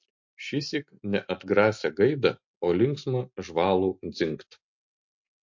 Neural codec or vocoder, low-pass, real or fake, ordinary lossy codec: none; 7.2 kHz; real; MP3, 48 kbps